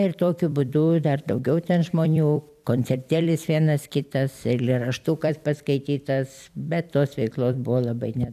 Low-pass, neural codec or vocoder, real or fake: 14.4 kHz; vocoder, 44.1 kHz, 128 mel bands every 256 samples, BigVGAN v2; fake